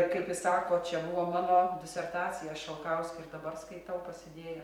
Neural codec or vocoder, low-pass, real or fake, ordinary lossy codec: vocoder, 44.1 kHz, 128 mel bands every 256 samples, BigVGAN v2; 19.8 kHz; fake; MP3, 96 kbps